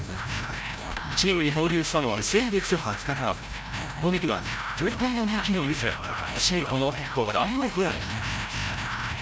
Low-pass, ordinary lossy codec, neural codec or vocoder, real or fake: none; none; codec, 16 kHz, 0.5 kbps, FreqCodec, larger model; fake